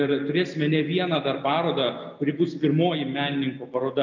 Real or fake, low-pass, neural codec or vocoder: real; 7.2 kHz; none